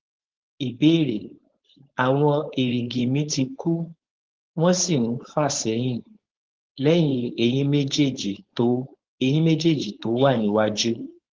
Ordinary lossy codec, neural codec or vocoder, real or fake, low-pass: Opus, 16 kbps; codec, 16 kHz, 4.8 kbps, FACodec; fake; 7.2 kHz